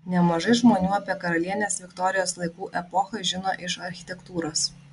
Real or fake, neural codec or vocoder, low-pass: real; none; 10.8 kHz